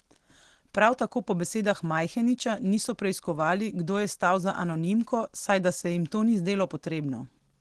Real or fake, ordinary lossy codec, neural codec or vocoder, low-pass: real; Opus, 16 kbps; none; 10.8 kHz